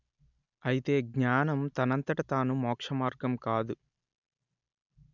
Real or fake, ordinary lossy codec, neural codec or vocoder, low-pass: real; none; none; 7.2 kHz